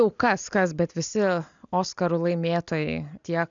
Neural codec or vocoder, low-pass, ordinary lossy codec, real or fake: none; 7.2 kHz; MP3, 96 kbps; real